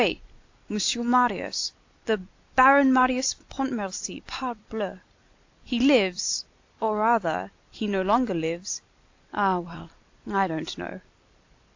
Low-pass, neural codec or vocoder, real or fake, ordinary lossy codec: 7.2 kHz; vocoder, 44.1 kHz, 128 mel bands every 256 samples, BigVGAN v2; fake; AAC, 48 kbps